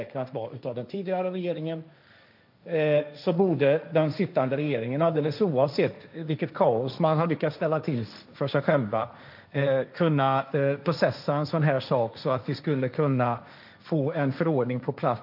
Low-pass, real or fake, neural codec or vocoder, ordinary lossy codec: 5.4 kHz; fake; codec, 16 kHz, 1.1 kbps, Voila-Tokenizer; none